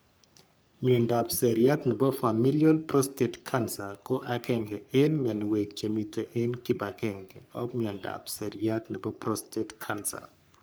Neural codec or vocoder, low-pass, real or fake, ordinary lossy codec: codec, 44.1 kHz, 3.4 kbps, Pupu-Codec; none; fake; none